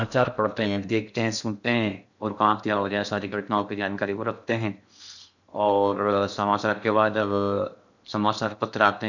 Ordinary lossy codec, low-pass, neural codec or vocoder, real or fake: none; 7.2 kHz; codec, 16 kHz in and 24 kHz out, 0.6 kbps, FocalCodec, streaming, 2048 codes; fake